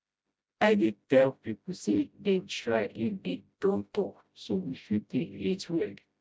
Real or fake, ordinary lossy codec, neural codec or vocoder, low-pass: fake; none; codec, 16 kHz, 0.5 kbps, FreqCodec, smaller model; none